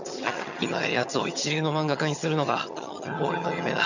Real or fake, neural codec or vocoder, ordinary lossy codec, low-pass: fake; vocoder, 22.05 kHz, 80 mel bands, HiFi-GAN; none; 7.2 kHz